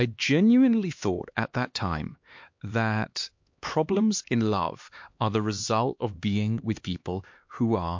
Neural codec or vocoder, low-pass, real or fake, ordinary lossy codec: codec, 16 kHz, 2 kbps, X-Codec, HuBERT features, trained on LibriSpeech; 7.2 kHz; fake; MP3, 48 kbps